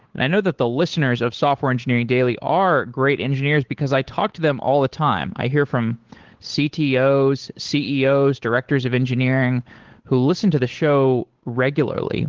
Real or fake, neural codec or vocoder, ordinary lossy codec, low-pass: fake; codec, 16 kHz, 8 kbps, FunCodec, trained on Chinese and English, 25 frames a second; Opus, 16 kbps; 7.2 kHz